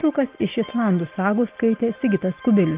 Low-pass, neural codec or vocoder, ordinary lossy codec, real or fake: 3.6 kHz; none; Opus, 32 kbps; real